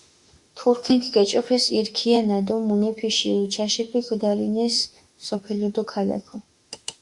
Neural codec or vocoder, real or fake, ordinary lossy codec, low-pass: autoencoder, 48 kHz, 32 numbers a frame, DAC-VAE, trained on Japanese speech; fake; Opus, 64 kbps; 10.8 kHz